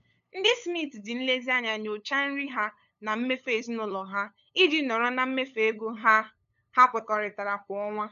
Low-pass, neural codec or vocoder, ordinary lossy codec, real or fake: 7.2 kHz; codec, 16 kHz, 8 kbps, FunCodec, trained on LibriTTS, 25 frames a second; none; fake